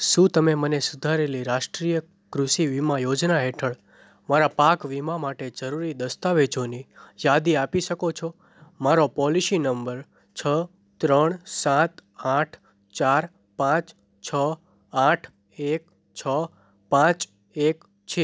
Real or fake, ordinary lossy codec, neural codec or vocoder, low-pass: real; none; none; none